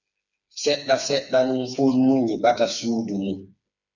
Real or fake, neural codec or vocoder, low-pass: fake; codec, 16 kHz, 4 kbps, FreqCodec, smaller model; 7.2 kHz